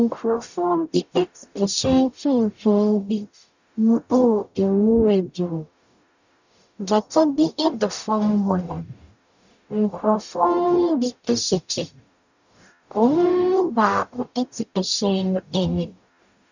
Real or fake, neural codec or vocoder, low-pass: fake; codec, 44.1 kHz, 0.9 kbps, DAC; 7.2 kHz